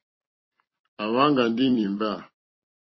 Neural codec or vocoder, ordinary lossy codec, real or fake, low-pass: vocoder, 44.1 kHz, 128 mel bands every 256 samples, BigVGAN v2; MP3, 24 kbps; fake; 7.2 kHz